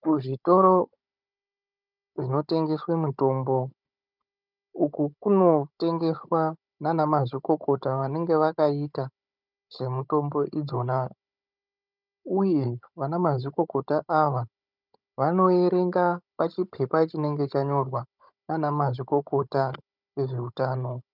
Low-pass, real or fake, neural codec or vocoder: 5.4 kHz; fake; codec, 16 kHz, 16 kbps, FunCodec, trained on Chinese and English, 50 frames a second